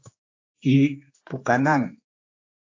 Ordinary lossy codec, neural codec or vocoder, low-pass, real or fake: AAC, 48 kbps; codec, 16 kHz, 2 kbps, X-Codec, HuBERT features, trained on general audio; 7.2 kHz; fake